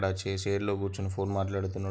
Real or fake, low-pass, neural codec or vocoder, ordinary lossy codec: real; none; none; none